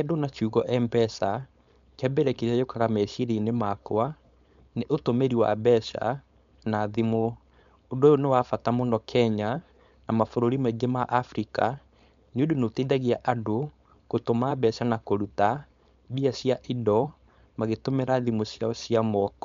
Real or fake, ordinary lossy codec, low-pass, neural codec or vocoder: fake; MP3, 64 kbps; 7.2 kHz; codec, 16 kHz, 4.8 kbps, FACodec